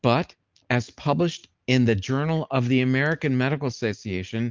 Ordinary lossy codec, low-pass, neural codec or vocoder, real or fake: Opus, 24 kbps; 7.2 kHz; none; real